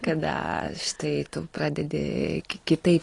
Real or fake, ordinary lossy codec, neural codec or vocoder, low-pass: real; AAC, 32 kbps; none; 10.8 kHz